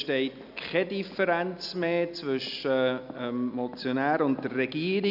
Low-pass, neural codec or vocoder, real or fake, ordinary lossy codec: 5.4 kHz; none; real; none